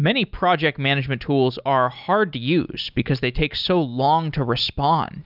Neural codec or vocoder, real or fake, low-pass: none; real; 5.4 kHz